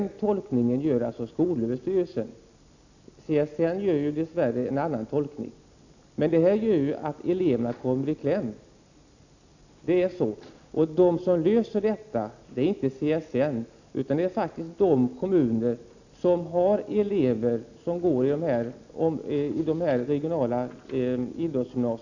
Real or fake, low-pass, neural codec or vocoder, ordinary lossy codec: real; 7.2 kHz; none; none